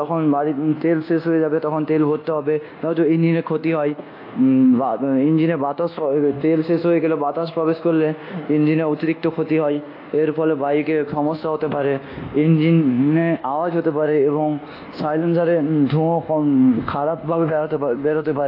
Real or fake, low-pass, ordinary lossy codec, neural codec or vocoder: fake; 5.4 kHz; AAC, 32 kbps; codec, 24 kHz, 1.2 kbps, DualCodec